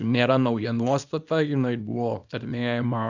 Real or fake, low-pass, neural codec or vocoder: fake; 7.2 kHz; codec, 24 kHz, 0.9 kbps, WavTokenizer, small release